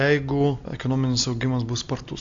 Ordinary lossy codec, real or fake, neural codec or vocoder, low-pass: AAC, 64 kbps; real; none; 7.2 kHz